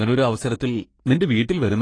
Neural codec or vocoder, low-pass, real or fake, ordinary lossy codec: codec, 44.1 kHz, 3.4 kbps, Pupu-Codec; 9.9 kHz; fake; AAC, 32 kbps